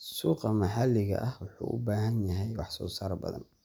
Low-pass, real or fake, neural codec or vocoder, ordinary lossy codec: none; real; none; none